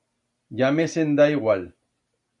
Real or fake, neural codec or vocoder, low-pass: real; none; 10.8 kHz